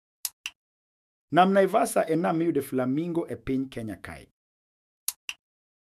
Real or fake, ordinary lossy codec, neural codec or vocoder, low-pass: fake; none; autoencoder, 48 kHz, 128 numbers a frame, DAC-VAE, trained on Japanese speech; 14.4 kHz